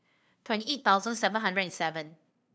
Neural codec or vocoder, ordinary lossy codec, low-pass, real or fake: codec, 16 kHz, 2 kbps, FunCodec, trained on LibriTTS, 25 frames a second; none; none; fake